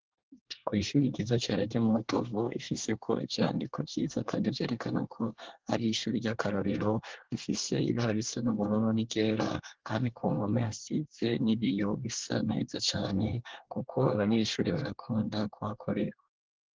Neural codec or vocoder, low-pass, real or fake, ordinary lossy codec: codec, 24 kHz, 1 kbps, SNAC; 7.2 kHz; fake; Opus, 16 kbps